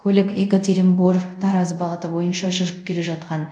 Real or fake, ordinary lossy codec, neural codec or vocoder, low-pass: fake; none; codec, 24 kHz, 0.5 kbps, DualCodec; 9.9 kHz